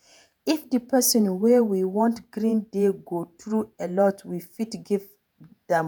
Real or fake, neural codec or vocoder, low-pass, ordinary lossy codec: fake; vocoder, 48 kHz, 128 mel bands, Vocos; none; none